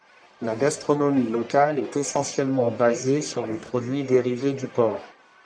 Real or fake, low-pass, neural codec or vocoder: fake; 9.9 kHz; codec, 44.1 kHz, 1.7 kbps, Pupu-Codec